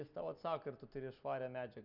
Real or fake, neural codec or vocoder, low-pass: real; none; 5.4 kHz